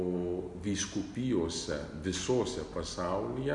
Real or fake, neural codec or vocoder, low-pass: real; none; 10.8 kHz